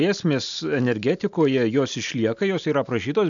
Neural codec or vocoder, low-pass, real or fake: none; 7.2 kHz; real